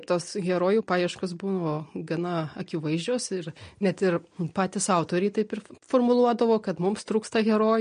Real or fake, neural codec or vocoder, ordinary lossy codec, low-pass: real; none; MP3, 48 kbps; 9.9 kHz